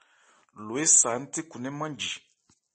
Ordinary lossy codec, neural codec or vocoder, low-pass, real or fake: MP3, 32 kbps; none; 10.8 kHz; real